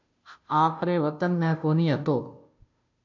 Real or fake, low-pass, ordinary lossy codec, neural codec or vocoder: fake; 7.2 kHz; MP3, 48 kbps; codec, 16 kHz, 0.5 kbps, FunCodec, trained on Chinese and English, 25 frames a second